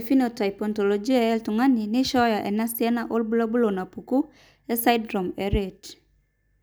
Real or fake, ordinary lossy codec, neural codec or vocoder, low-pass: real; none; none; none